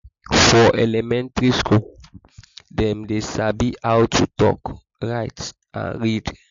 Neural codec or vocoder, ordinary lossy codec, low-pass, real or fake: none; MP3, 48 kbps; 7.2 kHz; real